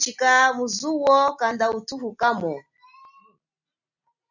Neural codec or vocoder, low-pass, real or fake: none; 7.2 kHz; real